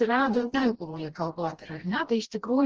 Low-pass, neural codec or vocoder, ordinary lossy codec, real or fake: 7.2 kHz; codec, 16 kHz, 1 kbps, FreqCodec, smaller model; Opus, 16 kbps; fake